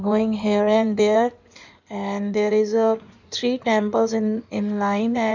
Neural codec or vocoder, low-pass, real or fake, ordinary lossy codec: codec, 16 kHz in and 24 kHz out, 2.2 kbps, FireRedTTS-2 codec; 7.2 kHz; fake; none